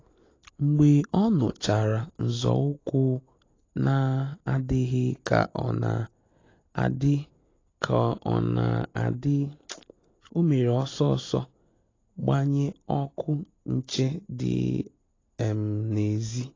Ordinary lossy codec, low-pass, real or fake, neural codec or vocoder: AAC, 32 kbps; 7.2 kHz; real; none